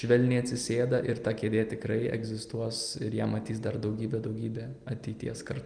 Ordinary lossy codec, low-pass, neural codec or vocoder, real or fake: Opus, 32 kbps; 9.9 kHz; none; real